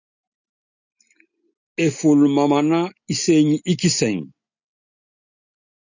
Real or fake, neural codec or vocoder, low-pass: real; none; 7.2 kHz